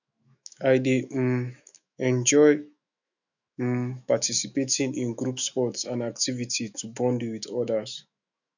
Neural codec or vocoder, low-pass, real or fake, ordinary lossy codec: autoencoder, 48 kHz, 128 numbers a frame, DAC-VAE, trained on Japanese speech; 7.2 kHz; fake; none